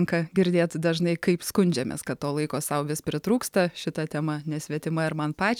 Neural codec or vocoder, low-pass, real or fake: none; 19.8 kHz; real